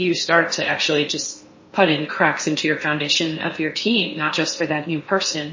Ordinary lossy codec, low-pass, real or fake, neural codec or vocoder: MP3, 32 kbps; 7.2 kHz; fake; codec, 16 kHz in and 24 kHz out, 0.8 kbps, FocalCodec, streaming, 65536 codes